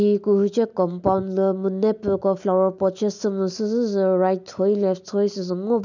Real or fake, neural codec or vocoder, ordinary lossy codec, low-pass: real; none; none; 7.2 kHz